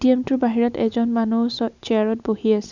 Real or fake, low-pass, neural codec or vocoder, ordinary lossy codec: real; 7.2 kHz; none; none